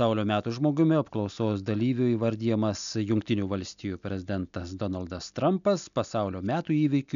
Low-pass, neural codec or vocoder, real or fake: 7.2 kHz; none; real